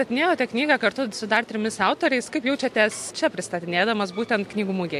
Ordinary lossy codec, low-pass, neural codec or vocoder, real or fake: MP3, 64 kbps; 14.4 kHz; none; real